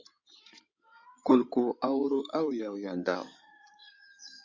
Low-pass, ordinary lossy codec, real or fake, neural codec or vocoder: 7.2 kHz; Opus, 64 kbps; fake; codec, 16 kHz in and 24 kHz out, 2.2 kbps, FireRedTTS-2 codec